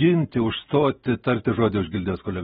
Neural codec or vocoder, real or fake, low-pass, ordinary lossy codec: none; real; 19.8 kHz; AAC, 16 kbps